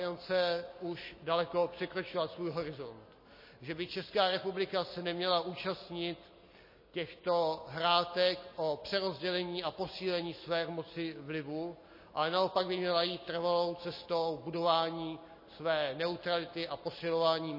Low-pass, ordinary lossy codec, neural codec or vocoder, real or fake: 5.4 kHz; MP3, 24 kbps; none; real